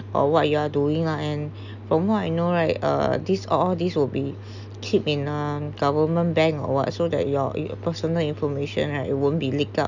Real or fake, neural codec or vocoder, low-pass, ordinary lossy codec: real; none; 7.2 kHz; none